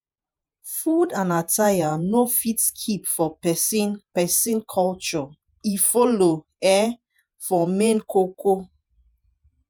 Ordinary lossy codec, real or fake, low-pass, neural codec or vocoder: none; fake; none; vocoder, 48 kHz, 128 mel bands, Vocos